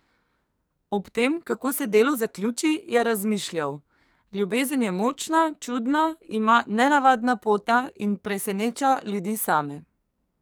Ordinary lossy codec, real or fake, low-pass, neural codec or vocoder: none; fake; none; codec, 44.1 kHz, 2.6 kbps, SNAC